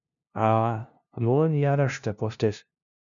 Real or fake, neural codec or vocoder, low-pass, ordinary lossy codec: fake; codec, 16 kHz, 0.5 kbps, FunCodec, trained on LibriTTS, 25 frames a second; 7.2 kHz; MP3, 96 kbps